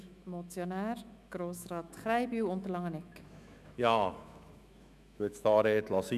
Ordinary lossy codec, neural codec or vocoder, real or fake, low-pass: none; autoencoder, 48 kHz, 128 numbers a frame, DAC-VAE, trained on Japanese speech; fake; 14.4 kHz